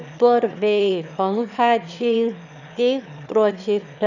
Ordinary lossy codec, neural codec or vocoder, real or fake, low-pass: none; autoencoder, 22.05 kHz, a latent of 192 numbers a frame, VITS, trained on one speaker; fake; 7.2 kHz